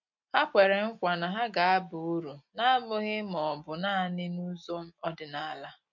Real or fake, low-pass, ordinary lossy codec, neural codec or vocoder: real; 7.2 kHz; MP3, 48 kbps; none